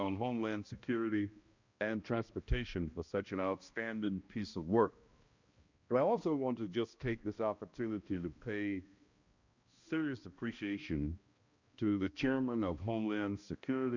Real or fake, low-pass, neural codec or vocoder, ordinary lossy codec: fake; 7.2 kHz; codec, 16 kHz, 1 kbps, X-Codec, HuBERT features, trained on balanced general audio; Opus, 64 kbps